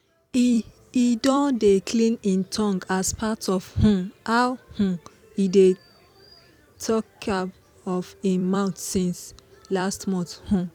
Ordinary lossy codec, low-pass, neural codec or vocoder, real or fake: none; 19.8 kHz; vocoder, 44.1 kHz, 128 mel bands every 256 samples, BigVGAN v2; fake